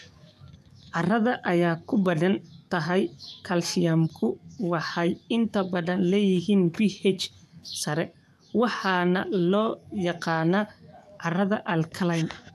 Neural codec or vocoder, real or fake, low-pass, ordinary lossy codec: codec, 44.1 kHz, 7.8 kbps, DAC; fake; 14.4 kHz; none